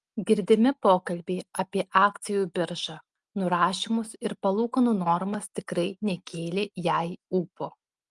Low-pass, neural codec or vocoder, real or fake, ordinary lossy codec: 10.8 kHz; none; real; Opus, 32 kbps